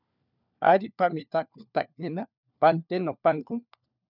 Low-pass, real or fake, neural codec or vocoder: 5.4 kHz; fake; codec, 16 kHz, 4 kbps, FunCodec, trained on LibriTTS, 50 frames a second